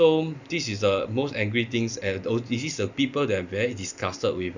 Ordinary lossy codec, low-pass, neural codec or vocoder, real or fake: none; 7.2 kHz; none; real